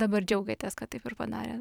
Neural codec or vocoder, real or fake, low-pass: vocoder, 44.1 kHz, 128 mel bands every 256 samples, BigVGAN v2; fake; 19.8 kHz